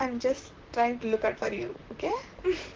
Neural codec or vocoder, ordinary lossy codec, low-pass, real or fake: vocoder, 44.1 kHz, 128 mel bands, Pupu-Vocoder; Opus, 16 kbps; 7.2 kHz; fake